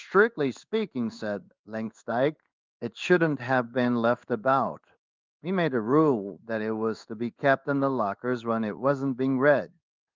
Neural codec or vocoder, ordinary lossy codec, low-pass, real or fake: codec, 16 kHz in and 24 kHz out, 1 kbps, XY-Tokenizer; Opus, 24 kbps; 7.2 kHz; fake